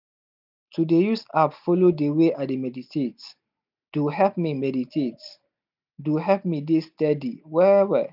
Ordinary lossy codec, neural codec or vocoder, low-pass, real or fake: none; none; 5.4 kHz; real